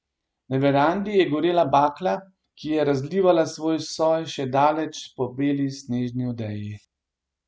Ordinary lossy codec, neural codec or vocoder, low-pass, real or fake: none; none; none; real